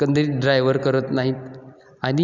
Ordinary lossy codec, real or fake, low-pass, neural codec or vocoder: none; real; 7.2 kHz; none